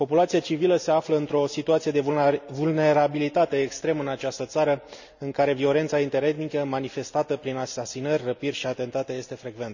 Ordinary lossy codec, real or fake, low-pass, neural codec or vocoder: none; real; 7.2 kHz; none